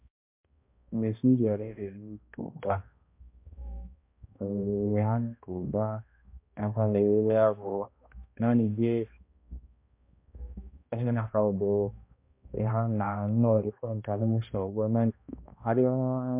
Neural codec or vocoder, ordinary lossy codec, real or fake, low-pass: codec, 16 kHz, 1 kbps, X-Codec, HuBERT features, trained on balanced general audio; MP3, 32 kbps; fake; 3.6 kHz